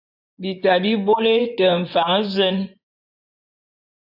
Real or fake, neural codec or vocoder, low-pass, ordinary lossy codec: fake; vocoder, 24 kHz, 100 mel bands, Vocos; 5.4 kHz; AAC, 48 kbps